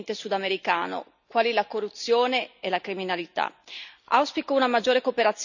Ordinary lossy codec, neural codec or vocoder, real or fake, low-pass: none; none; real; 7.2 kHz